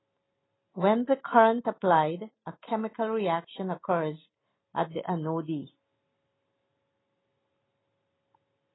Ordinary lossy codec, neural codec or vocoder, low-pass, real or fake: AAC, 16 kbps; none; 7.2 kHz; real